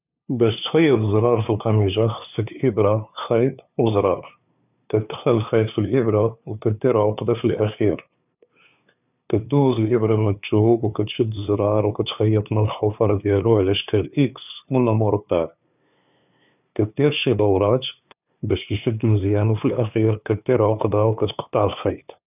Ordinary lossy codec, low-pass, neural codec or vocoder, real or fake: none; 3.6 kHz; codec, 16 kHz, 2 kbps, FunCodec, trained on LibriTTS, 25 frames a second; fake